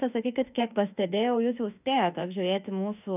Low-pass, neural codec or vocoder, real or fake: 3.6 kHz; autoencoder, 48 kHz, 32 numbers a frame, DAC-VAE, trained on Japanese speech; fake